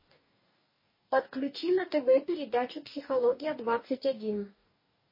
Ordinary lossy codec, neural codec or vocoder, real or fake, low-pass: MP3, 24 kbps; codec, 44.1 kHz, 2.6 kbps, DAC; fake; 5.4 kHz